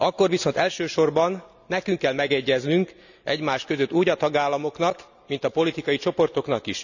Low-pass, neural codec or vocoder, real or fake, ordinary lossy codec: 7.2 kHz; none; real; none